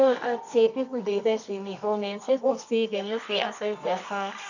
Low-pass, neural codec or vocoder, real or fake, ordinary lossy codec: 7.2 kHz; codec, 24 kHz, 0.9 kbps, WavTokenizer, medium music audio release; fake; none